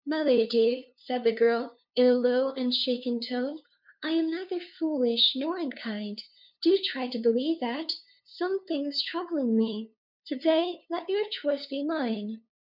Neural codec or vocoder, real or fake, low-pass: codec, 16 kHz, 2 kbps, FunCodec, trained on LibriTTS, 25 frames a second; fake; 5.4 kHz